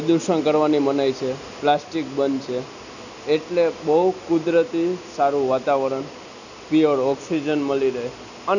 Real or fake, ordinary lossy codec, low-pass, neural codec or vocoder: real; none; 7.2 kHz; none